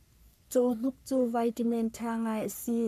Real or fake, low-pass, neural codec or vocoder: fake; 14.4 kHz; codec, 44.1 kHz, 3.4 kbps, Pupu-Codec